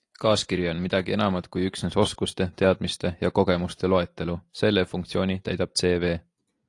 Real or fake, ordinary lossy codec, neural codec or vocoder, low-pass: real; AAC, 48 kbps; none; 10.8 kHz